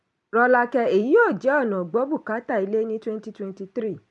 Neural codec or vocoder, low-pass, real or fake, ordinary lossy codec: vocoder, 44.1 kHz, 128 mel bands every 256 samples, BigVGAN v2; 10.8 kHz; fake; MP3, 64 kbps